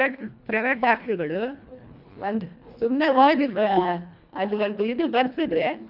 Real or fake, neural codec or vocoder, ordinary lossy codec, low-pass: fake; codec, 24 kHz, 1.5 kbps, HILCodec; none; 5.4 kHz